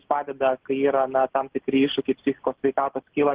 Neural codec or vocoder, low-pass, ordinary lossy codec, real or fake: none; 3.6 kHz; Opus, 16 kbps; real